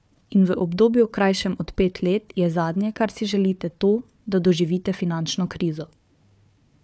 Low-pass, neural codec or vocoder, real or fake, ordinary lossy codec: none; codec, 16 kHz, 4 kbps, FunCodec, trained on Chinese and English, 50 frames a second; fake; none